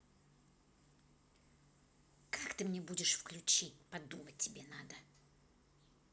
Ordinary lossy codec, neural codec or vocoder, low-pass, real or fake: none; none; none; real